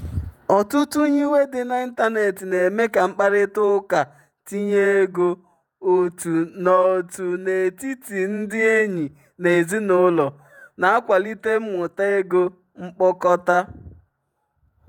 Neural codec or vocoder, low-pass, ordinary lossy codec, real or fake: vocoder, 48 kHz, 128 mel bands, Vocos; 19.8 kHz; none; fake